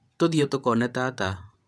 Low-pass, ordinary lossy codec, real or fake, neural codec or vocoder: none; none; fake; vocoder, 22.05 kHz, 80 mel bands, WaveNeXt